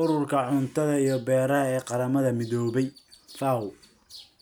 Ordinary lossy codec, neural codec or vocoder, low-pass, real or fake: none; none; none; real